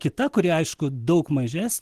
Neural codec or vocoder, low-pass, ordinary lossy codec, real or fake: none; 14.4 kHz; Opus, 16 kbps; real